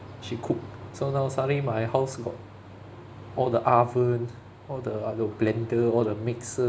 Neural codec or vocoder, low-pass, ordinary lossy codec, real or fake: none; none; none; real